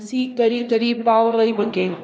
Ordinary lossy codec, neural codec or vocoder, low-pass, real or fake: none; codec, 16 kHz, 1 kbps, X-Codec, HuBERT features, trained on LibriSpeech; none; fake